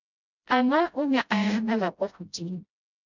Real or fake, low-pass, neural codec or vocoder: fake; 7.2 kHz; codec, 16 kHz, 0.5 kbps, FreqCodec, smaller model